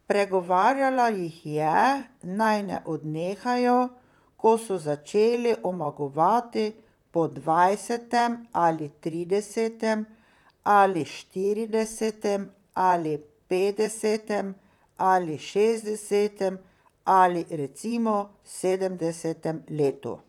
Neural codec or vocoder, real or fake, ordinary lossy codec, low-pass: vocoder, 44.1 kHz, 128 mel bands, Pupu-Vocoder; fake; none; 19.8 kHz